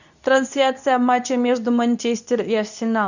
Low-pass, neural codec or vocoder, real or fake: 7.2 kHz; codec, 24 kHz, 0.9 kbps, WavTokenizer, medium speech release version 2; fake